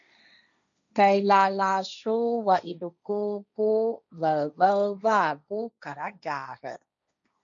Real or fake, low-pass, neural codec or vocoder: fake; 7.2 kHz; codec, 16 kHz, 1.1 kbps, Voila-Tokenizer